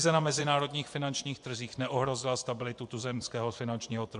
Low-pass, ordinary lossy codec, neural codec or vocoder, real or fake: 10.8 kHz; MP3, 64 kbps; vocoder, 24 kHz, 100 mel bands, Vocos; fake